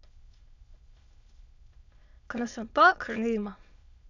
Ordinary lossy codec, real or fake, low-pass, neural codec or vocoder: none; fake; 7.2 kHz; autoencoder, 22.05 kHz, a latent of 192 numbers a frame, VITS, trained on many speakers